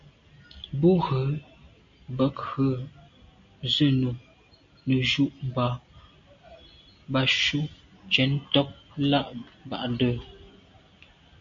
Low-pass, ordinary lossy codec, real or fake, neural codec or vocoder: 7.2 kHz; MP3, 48 kbps; real; none